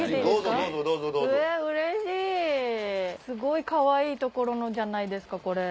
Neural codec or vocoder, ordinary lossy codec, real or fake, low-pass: none; none; real; none